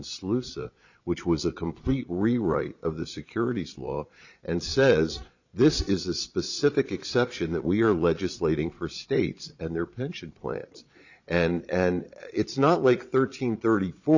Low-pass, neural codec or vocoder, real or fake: 7.2 kHz; vocoder, 22.05 kHz, 80 mel bands, Vocos; fake